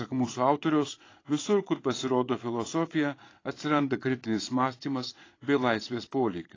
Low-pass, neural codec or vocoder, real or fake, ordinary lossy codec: 7.2 kHz; vocoder, 22.05 kHz, 80 mel bands, Vocos; fake; AAC, 32 kbps